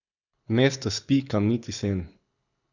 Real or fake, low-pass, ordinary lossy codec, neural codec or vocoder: fake; 7.2 kHz; none; codec, 24 kHz, 6 kbps, HILCodec